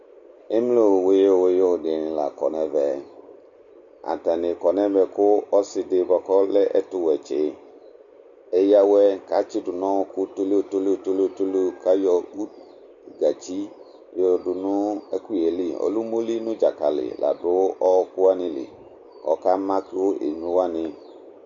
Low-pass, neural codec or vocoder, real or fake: 7.2 kHz; none; real